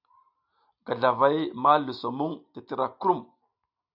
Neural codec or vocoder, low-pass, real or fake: none; 5.4 kHz; real